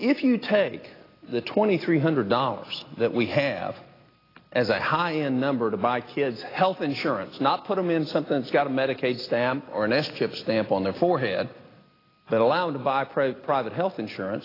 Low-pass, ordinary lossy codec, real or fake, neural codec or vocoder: 5.4 kHz; AAC, 24 kbps; real; none